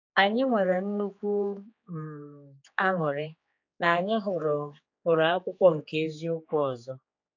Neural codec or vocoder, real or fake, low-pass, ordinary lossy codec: codec, 16 kHz, 4 kbps, X-Codec, HuBERT features, trained on general audio; fake; 7.2 kHz; AAC, 48 kbps